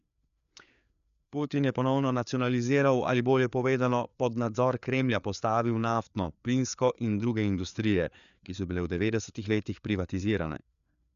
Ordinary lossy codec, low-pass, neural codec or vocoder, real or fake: none; 7.2 kHz; codec, 16 kHz, 4 kbps, FreqCodec, larger model; fake